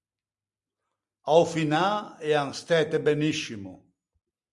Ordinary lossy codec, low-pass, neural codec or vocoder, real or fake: Opus, 64 kbps; 10.8 kHz; none; real